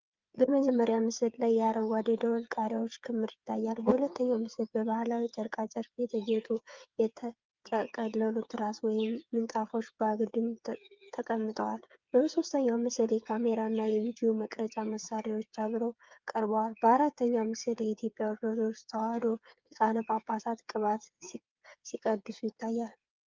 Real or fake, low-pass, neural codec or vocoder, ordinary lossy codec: fake; 7.2 kHz; codec, 16 kHz, 8 kbps, FreqCodec, smaller model; Opus, 24 kbps